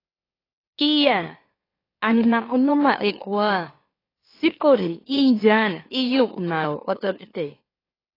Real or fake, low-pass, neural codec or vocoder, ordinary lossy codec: fake; 5.4 kHz; autoencoder, 44.1 kHz, a latent of 192 numbers a frame, MeloTTS; AAC, 24 kbps